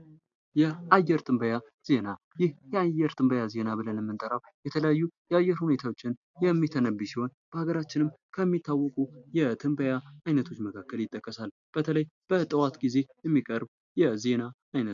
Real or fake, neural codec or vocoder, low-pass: real; none; 7.2 kHz